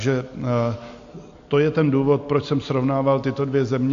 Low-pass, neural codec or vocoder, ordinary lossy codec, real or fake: 7.2 kHz; none; AAC, 48 kbps; real